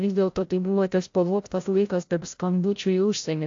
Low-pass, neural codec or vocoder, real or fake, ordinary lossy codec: 7.2 kHz; codec, 16 kHz, 0.5 kbps, FreqCodec, larger model; fake; AAC, 48 kbps